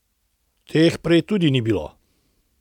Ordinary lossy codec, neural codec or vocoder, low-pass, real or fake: none; none; 19.8 kHz; real